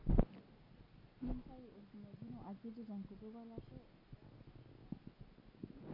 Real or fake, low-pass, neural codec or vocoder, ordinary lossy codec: real; 5.4 kHz; none; MP3, 32 kbps